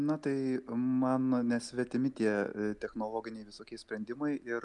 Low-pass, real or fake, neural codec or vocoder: 10.8 kHz; real; none